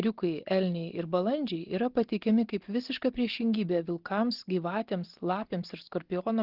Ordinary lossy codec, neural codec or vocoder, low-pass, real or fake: Opus, 16 kbps; none; 5.4 kHz; real